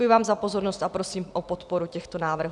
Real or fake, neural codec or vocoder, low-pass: real; none; 10.8 kHz